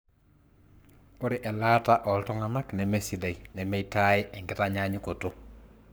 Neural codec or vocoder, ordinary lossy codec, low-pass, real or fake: codec, 44.1 kHz, 7.8 kbps, Pupu-Codec; none; none; fake